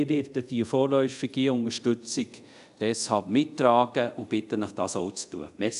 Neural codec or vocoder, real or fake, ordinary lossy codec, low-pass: codec, 24 kHz, 0.5 kbps, DualCodec; fake; none; 10.8 kHz